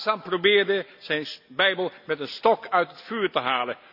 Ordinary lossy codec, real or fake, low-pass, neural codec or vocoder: none; real; 5.4 kHz; none